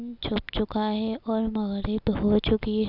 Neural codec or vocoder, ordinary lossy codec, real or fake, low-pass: none; none; real; 5.4 kHz